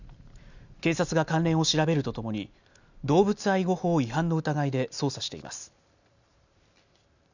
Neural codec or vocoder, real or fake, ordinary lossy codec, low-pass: none; real; none; 7.2 kHz